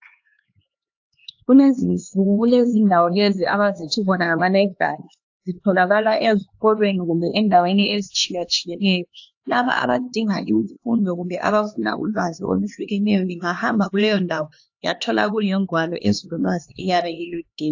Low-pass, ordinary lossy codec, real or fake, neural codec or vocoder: 7.2 kHz; AAC, 48 kbps; fake; codec, 16 kHz, 2 kbps, X-Codec, HuBERT features, trained on LibriSpeech